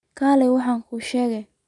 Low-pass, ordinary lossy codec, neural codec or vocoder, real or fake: 10.8 kHz; none; none; real